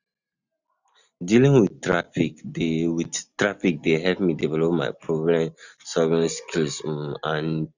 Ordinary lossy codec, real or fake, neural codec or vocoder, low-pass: none; real; none; 7.2 kHz